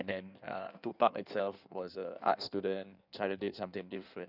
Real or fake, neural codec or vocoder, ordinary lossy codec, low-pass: fake; codec, 16 kHz in and 24 kHz out, 1.1 kbps, FireRedTTS-2 codec; none; 5.4 kHz